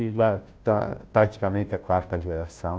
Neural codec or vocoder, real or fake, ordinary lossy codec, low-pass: codec, 16 kHz, 0.5 kbps, FunCodec, trained on Chinese and English, 25 frames a second; fake; none; none